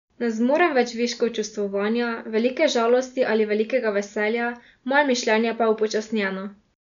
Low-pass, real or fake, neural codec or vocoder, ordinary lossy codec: 7.2 kHz; real; none; none